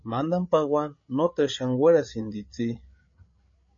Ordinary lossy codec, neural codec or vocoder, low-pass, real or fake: MP3, 32 kbps; codec, 16 kHz, 8 kbps, FreqCodec, larger model; 7.2 kHz; fake